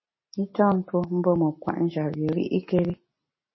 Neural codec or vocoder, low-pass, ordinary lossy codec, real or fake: none; 7.2 kHz; MP3, 24 kbps; real